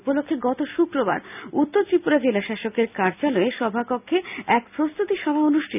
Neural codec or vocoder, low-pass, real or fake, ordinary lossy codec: none; 3.6 kHz; real; none